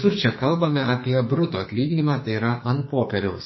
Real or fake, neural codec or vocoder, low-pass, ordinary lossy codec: fake; codec, 44.1 kHz, 2.6 kbps, SNAC; 7.2 kHz; MP3, 24 kbps